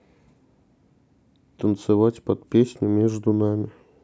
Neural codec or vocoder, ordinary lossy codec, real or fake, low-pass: none; none; real; none